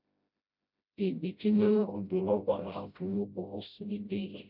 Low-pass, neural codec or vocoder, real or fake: 5.4 kHz; codec, 16 kHz, 0.5 kbps, FreqCodec, smaller model; fake